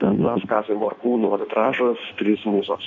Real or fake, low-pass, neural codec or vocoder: fake; 7.2 kHz; codec, 16 kHz in and 24 kHz out, 1.1 kbps, FireRedTTS-2 codec